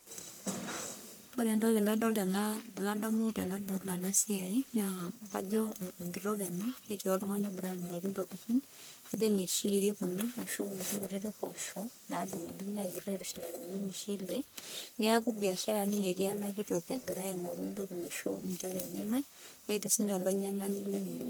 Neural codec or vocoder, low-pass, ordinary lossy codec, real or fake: codec, 44.1 kHz, 1.7 kbps, Pupu-Codec; none; none; fake